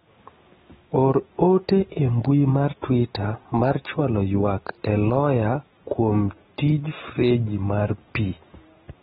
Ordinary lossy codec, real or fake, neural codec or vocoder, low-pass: AAC, 16 kbps; real; none; 19.8 kHz